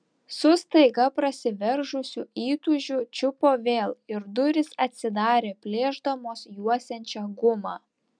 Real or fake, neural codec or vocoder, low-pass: real; none; 9.9 kHz